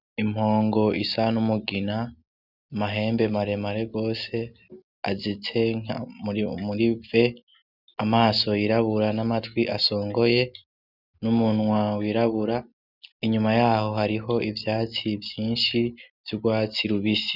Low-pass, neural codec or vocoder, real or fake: 5.4 kHz; none; real